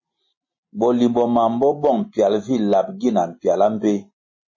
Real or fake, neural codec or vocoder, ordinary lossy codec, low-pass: real; none; MP3, 32 kbps; 7.2 kHz